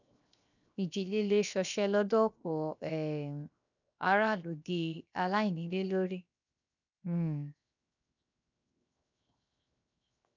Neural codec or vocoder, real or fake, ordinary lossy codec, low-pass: codec, 16 kHz, 0.7 kbps, FocalCodec; fake; none; 7.2 kHz